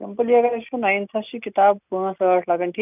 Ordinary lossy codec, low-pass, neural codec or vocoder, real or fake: none; 3.6 kHz; none; real